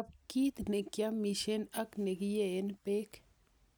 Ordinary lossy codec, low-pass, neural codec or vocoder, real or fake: none; none; none; real